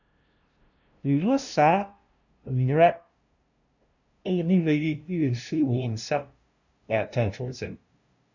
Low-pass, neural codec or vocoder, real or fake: 7.2 kHz; codec, 16 kHz, 0.5 kbps, FunCodec, trained on LibriTTS, 25 frames a second; fake